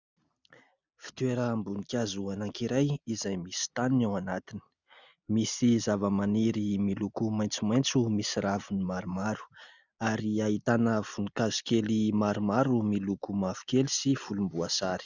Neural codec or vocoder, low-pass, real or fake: none; 7.2 kHz; real